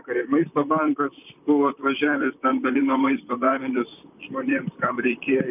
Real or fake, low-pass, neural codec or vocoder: fake; 3.6 kHz; vocoder, 44.1 kHz, 128 mel bands, Pupu-Vocoder